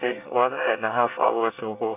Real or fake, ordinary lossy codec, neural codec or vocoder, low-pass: fake; none; codec, 24 kHz, 1 kbps, SNAC; 3.6 kHz